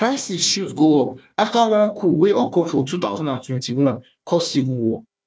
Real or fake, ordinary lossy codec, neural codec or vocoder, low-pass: fake; none; codec, 16 kHz, 1 kbps, FunCodec, trained on Chinese and English, 50 frames a second; none